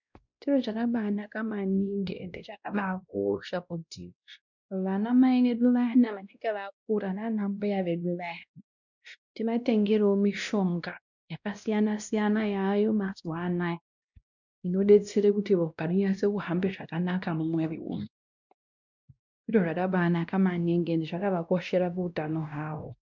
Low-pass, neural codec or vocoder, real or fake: 7.2 kHz; codec, 16 kHz, 1 kbps, X-Codec, WavLM features, trained on Multilingual LibriSpeech; fake